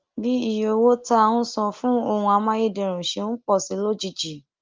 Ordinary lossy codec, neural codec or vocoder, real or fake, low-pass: Opus, 24 kbps; none; real; 7.2 kHz